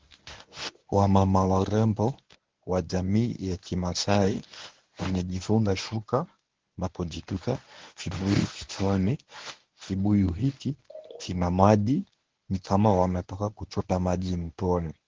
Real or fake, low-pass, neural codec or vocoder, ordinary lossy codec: fake; 7.2 kHz; codec, 24 kHz, 0.9 kbps, WavTokenizer, medium speech release version 1; Opus, 16 kbps